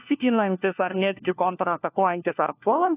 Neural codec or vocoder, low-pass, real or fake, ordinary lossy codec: codec, 16 kHz, 1 kbps, FunCodec, trained on LibriTTS, 50 frames a second; 3.6 kHz; fake; AAC, 24 kbps